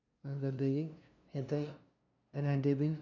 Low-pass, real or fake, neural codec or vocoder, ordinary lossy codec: 7.2 kHz; fake; codec, 16 kHz, 0.5 kbps, FunCodec, trained on LibriTTS, 25 frames a second; none